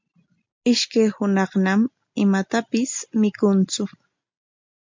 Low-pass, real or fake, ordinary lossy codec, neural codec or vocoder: 7.2 kHz; real; MP3, 64 kbps; none